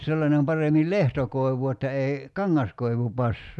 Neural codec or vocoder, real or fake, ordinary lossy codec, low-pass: none; real; none; none